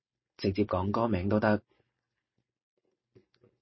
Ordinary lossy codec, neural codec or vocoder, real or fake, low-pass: MP3, 24 kbps; none; real; 7.2 kHz